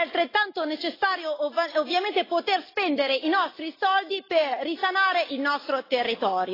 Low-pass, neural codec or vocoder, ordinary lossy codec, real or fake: 5.4 kHz; none; AAC, 24 kbps; real